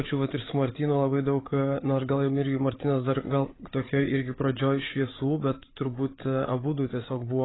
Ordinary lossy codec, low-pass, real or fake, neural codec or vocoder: AAC, 16 kbps; 7.2 kHz; fake; codec, 16 kHz, 8 kbps, FreqCodec, larger model